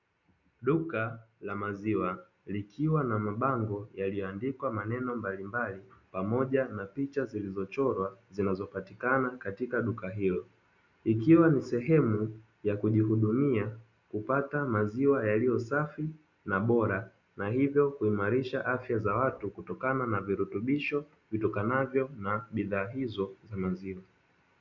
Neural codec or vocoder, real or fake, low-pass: none; real; 7.2 kHz